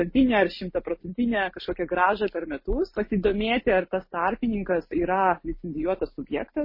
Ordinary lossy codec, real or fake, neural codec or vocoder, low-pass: MP3, 24 kbps; real; none; 5.4 kHz